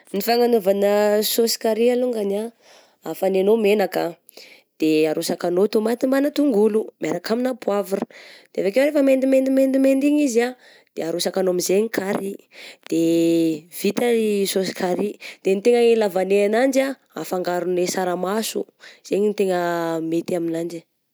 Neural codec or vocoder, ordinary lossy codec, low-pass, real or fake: none; none; none; real